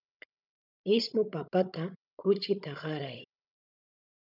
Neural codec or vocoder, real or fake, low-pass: codec, 16 kHz, 16 kbps, FunCodec, trained on Chinese and English, 50 frames a second; fake; 5.4 kHz